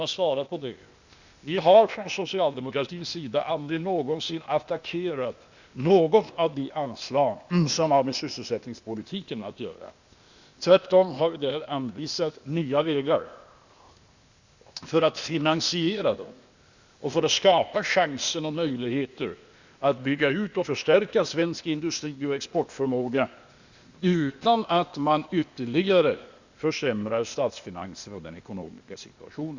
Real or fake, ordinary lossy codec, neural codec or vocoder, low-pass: fake; Opus, 64 kbps; codec, 16 kHz, 0.8 kbps, ZipCodec; 7.2 kHz